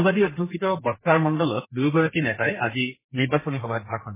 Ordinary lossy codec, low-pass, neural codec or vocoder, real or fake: MP3, 16 kbps; 3.6 kHz; codec, 32 kHz, 1.9 kbps, SNAC; fake